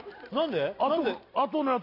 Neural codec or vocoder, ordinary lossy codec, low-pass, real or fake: none; AAC, 32 kbps; 5.4 kHz; real